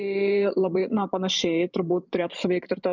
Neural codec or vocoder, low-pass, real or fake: vocoder, 44.1 kHz, 128 mel bands every 512 samples, BigVGAN v2; 7.2 kHz; fake